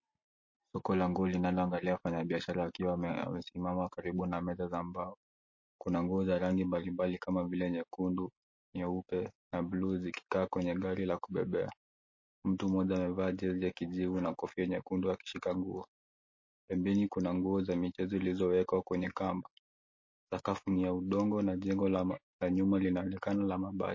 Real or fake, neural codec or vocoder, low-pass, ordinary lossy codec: real; none; 7.2 kHz; MP3, 32 kbps